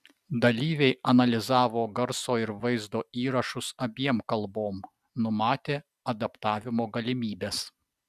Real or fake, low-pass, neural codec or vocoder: real; 14.4 kHz; none